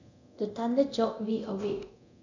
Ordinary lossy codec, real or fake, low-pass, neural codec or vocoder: none; fake; 7.2 kHz; codec, 24 kHz, 0.9 kbps, DualCodec